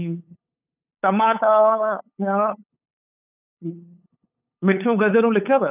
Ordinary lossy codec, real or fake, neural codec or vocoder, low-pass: none; fake; codec, 16 kHz, 8 kbps, FunCodec, trained on LibriTTS, 25 frames a second; 3.6 kHz